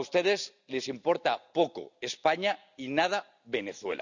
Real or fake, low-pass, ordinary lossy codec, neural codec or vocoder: real; 7.2 kHz; none; none